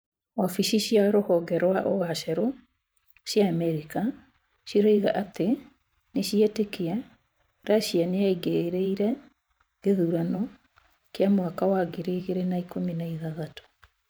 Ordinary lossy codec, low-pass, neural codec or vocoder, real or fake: none; none; vocoder, 44.1 kHz, 128 mel bands every 512 samples, BigVGAN v2; fake